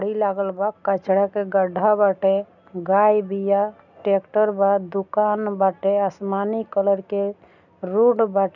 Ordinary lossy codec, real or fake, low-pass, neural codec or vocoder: none; real; 7.2 kHz; none